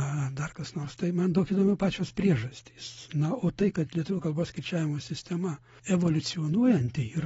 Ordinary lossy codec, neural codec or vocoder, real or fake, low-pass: AAC, 24 kbps; none; real; 19.8 kHz